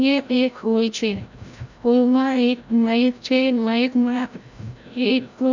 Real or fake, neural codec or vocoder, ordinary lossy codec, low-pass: fake; codec, 16 kHz, 0.5 kbps, FreqCodec, larger model; none; 7.2 kHz